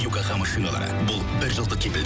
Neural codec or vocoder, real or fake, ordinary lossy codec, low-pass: none; real; none; none